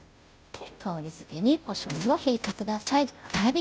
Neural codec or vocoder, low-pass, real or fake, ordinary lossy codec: codec, 16 kHz, 0.5 kbps, FunCodec, trained on Chinese and English, 25 frames a second; none; fake; none